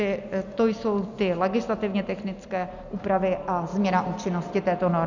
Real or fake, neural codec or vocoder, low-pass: real; none; 7.2 kHz